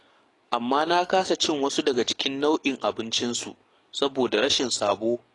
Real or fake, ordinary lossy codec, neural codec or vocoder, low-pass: fake; AAC, 32 kbps; codec, 44.1 kHz, 7.8 kbps, DAC; 10.8 kHz